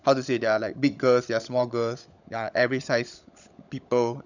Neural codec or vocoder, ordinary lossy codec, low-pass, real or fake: codec, 16 kHz, 16 kbps, FunCodec, trained on LibriTTS, 50 frames a second; none; 7.2 kHz; fake